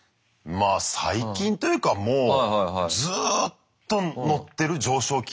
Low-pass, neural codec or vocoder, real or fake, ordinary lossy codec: none; none; real; none